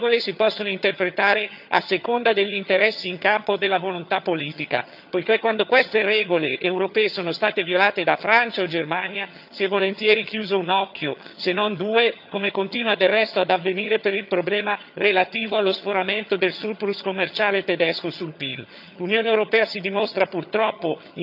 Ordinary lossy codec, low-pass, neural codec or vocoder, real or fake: none; 5.4 kHz; vocoder, 22.05 kHz, 80 mel bands, HiFi-GAN; fake